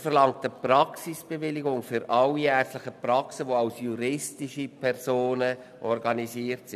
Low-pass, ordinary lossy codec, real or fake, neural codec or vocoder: 14.4 kHz; none; real; none